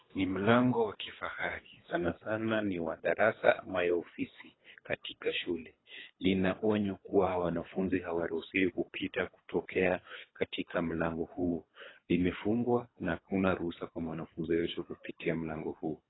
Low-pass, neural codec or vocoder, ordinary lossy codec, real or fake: 7.2 kHz; codec, 24 kHz, 3 kbps, HILCodec; AAC, 16 kbps; fake